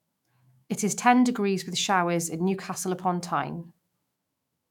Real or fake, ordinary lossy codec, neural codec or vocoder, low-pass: fake; none; autoencoder, 48 kHz, 128 numbers a frame, DAC-VAE, trained on Japanese speech; 19.8 kHz